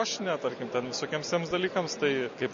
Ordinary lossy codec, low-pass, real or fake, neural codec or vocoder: MP3, 32 kbps; 7.2 kHz; real; none